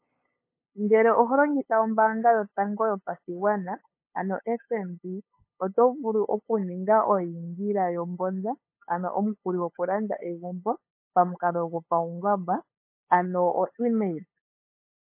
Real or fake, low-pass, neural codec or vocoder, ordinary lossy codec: fake; 3.6 kHz; codec, 16 kHz, 8 kbps, FunCodec, trained on LibriTTS, 25 frames a second; MP3, 24 kbps